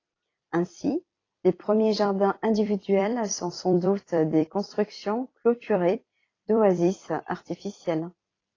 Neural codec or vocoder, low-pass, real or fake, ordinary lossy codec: vocoder, 44.1 kHz, 128 mel bands every 256 samples, BigVGAN v2; 7.2 kHz; fake; AAC, 32 kbps